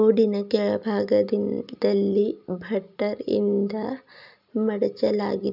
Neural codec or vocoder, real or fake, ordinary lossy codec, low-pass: none; real; none; 5.4 kHz